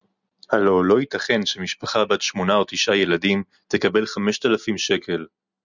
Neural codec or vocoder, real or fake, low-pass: none; real; 7.2 kHz